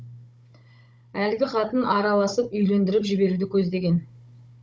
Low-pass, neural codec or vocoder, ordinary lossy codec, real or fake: none; codec, 16 kHz, 16 kbps, FunCodec, trained on Chinese and English, 50 frames a second; none; fake